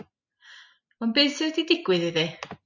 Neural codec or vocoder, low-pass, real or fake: none; 7.2 kHz; real